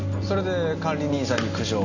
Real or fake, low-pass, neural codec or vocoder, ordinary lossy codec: real; 7.2 kHz; none; none